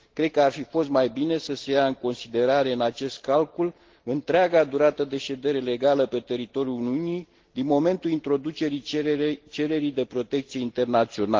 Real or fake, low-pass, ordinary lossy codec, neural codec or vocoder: real; 7.2 kHz; Opus, 16 kbps; none